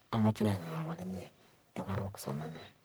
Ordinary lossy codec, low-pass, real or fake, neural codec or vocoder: none; none; fake; codec, 44.1 kHz, 1.7 kbps, Pupu-Codec